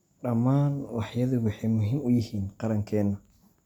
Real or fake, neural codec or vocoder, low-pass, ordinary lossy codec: real; none; 19.8 kHz; none